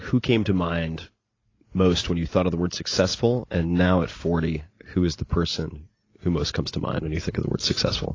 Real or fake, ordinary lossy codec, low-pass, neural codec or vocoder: real; AAC, 32 kbps; 7.2 kHz; none